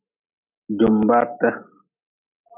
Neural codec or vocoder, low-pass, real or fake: none; 3.6 kHz; real